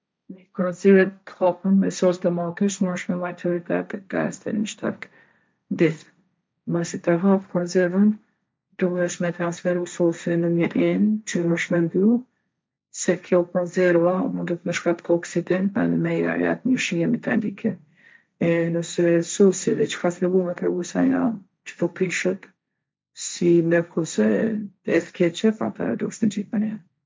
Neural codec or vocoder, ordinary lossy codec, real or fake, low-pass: codec, 16 kHz, 1.1 kbps, Voila-Tokenizer; none; fake; none